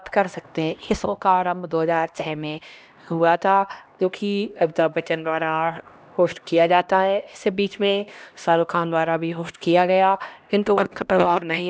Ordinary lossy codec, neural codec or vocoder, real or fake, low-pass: none; codec, 16 kHz, 1 kbps, X-Codec, HuBERT features, trained on LibriSpeech; fake; none